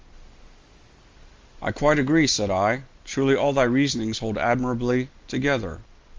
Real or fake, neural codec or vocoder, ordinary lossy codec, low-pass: real; none; Opus, 32 kbps; 7.2 kHz